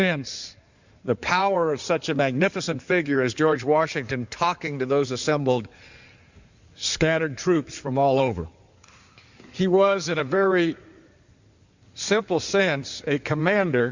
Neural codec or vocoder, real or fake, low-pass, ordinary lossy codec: codec, 16 kHz in and 24 kHz out, 2.2 kbps, FireRedTTS-2 codec; fake; 7.2 kHz; Opus, 64 kbps